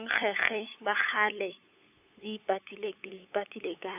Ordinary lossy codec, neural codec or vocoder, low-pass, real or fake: AAC, 32 kbps; codec, 16 kHz, 8 kbps, FunCodec, trained on LibriTTS, 25 frames a second; 3.6 kHz; fake